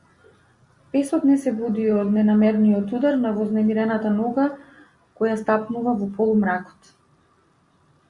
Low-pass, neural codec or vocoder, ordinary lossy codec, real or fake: 10.8 kHz; none; Opus, 64 kbps; real